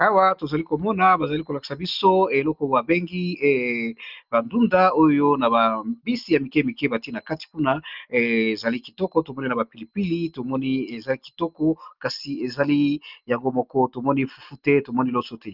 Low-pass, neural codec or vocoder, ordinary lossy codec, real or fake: 5.4 kHz; none; Opus, 24 kbps; real